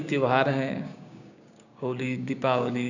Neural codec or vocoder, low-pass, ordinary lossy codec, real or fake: codec, 16 kHz, 6 kbps, DAC; 7.2 kHz; none; fake